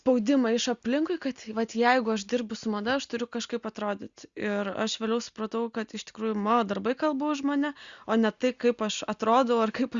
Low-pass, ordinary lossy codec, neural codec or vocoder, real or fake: 7.2 kHz; Opus, 64 kbps; none; real